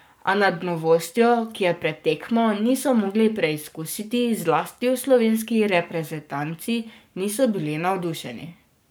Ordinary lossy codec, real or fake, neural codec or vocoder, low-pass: none; fake; codec, 44.1 kHz, 7.8 kbps, Pupu-Codec; none